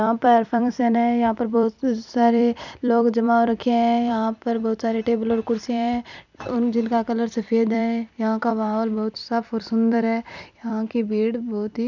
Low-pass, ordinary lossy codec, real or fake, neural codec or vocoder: 7.2 kHz; none; fake; vocoder, 44.1 kHz, 128 mel bands every 256 samples, BigVGAN v2